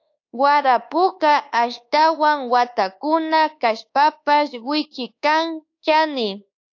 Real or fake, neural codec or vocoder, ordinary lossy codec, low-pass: fake; codec, 24 kHz, 1.2 kbps, DualCodec; AAC, 48 kbps; 7.2 kHz